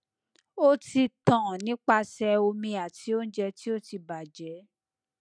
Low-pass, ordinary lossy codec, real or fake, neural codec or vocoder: 9.9 kHz; none; real; none